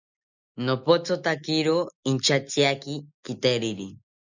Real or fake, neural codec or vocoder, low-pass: real; none; 7.2 kHz